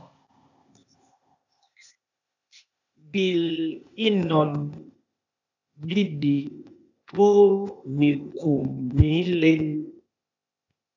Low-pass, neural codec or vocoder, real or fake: 7.2 kHz; codec, 16 kHz, 0.8 kbps, ZipCodec; fake